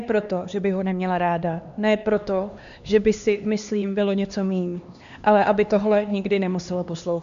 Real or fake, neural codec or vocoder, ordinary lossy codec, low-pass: fake; codec, 16 kHz, 2 kbps, X-Codec, HuBERT features, trained on LibriSpeech; MP3, 64 kbps; 7.2 kHz